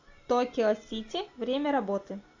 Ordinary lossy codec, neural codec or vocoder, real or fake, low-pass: AAC, 48 kbps; none; real; 7.2 kHz